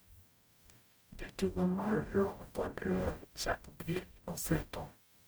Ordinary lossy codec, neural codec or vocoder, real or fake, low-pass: none; codec, 44.1 kHz, 0.9 kbps, DAC; fake; none